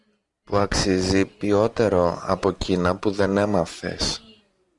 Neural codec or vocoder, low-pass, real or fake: none; 10.8 kHz; real